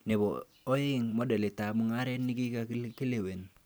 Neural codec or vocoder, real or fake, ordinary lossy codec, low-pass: none; real; none; none